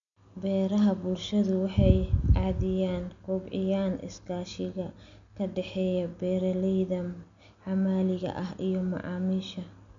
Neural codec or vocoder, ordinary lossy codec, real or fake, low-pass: none; MP3, 64 kbps; real; 7.2 kHz